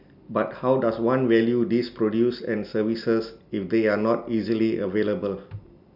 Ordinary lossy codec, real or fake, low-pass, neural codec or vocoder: none; real; 5.4 kHz; none